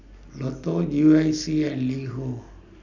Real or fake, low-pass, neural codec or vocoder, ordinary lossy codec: real; 7.2 kHz; none; none